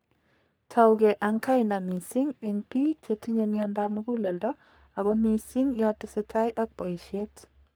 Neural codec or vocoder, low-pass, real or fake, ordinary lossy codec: codec, 44.1 kHz, 3.4 kbps, Pupu-Codec; none; fake; none